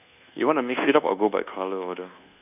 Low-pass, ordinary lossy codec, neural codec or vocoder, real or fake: 3.6 kHz; none; codec, 24 kHz, 1.2 kbps, DualCodec; fake